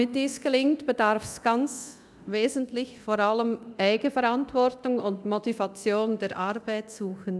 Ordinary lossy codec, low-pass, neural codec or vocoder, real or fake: none; none; codec, 24 kHz, 0.9 kbps, DualCodec; fake